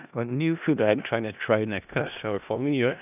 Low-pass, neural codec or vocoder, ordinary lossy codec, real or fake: 3.6 kHz; codec, 16 kHz in and 24 kHz out, 0.4 kbps, LongCat-Audio-Codec, four codebook decoder; none; fake